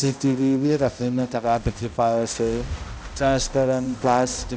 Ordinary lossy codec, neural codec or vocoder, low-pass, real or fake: none; codec, 16 kHz, 0.5 kbps, X-Codec, HuBERT features, trained on balanced general audio; none; fake